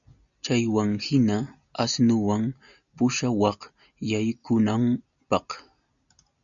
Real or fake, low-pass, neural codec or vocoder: real; 7.2 kHz; none